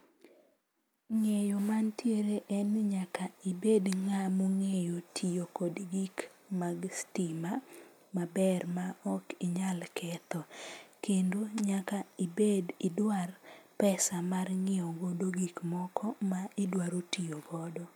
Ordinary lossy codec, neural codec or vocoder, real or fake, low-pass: none; none; real; none